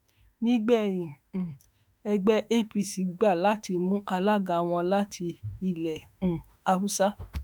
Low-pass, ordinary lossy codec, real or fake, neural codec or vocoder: none; none; fake; autoencoder, 48 kHz, 32 numbers a frame, DAC-VAE, trained on Japanese speech